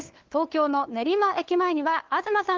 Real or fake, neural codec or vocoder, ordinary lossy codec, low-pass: fake; codec, 16 kHz, 2 kbps, FunCodec, trained on LibriTTS, 25 frames a second; Opus, 16 kbps; 7.2 kHz